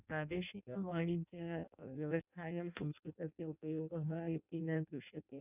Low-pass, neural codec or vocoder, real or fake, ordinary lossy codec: 3.6 kHz; codec, 16 kHz in and 24 kHz out, 0.6 kbps, FireRedTTS-2 codec; fake; none